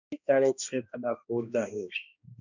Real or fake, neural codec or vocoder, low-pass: fake; codec, 16 kHz, 1 kbps, X-Codec, HuBERT features, trained on balanced general audio; 7.2 kHz